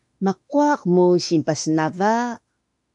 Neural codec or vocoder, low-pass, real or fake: autoencoder, 48 kHz, 32 numbers a frame, DAC-VAE, trained on Japanese speech; 10.8 kHz; fake